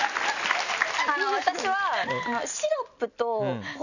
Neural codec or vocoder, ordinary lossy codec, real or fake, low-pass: none; none; real; 7.2 kHz